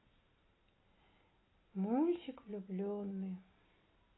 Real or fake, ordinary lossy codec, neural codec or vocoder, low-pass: real; AAC, 16 kbps; none; 7.2 kHz